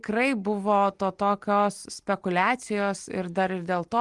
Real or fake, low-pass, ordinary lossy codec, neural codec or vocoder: real; 10.8 kHz; Opus, 24 kbps; none